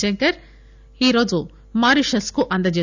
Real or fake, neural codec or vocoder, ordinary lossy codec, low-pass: real; none; none; 7.2 kHz